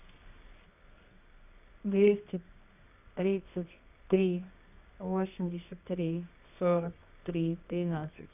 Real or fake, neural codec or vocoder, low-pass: fake; codec, 24 kHz, 0.9 kbps, WavTokenizer, medium music audio release; 3.6 kHz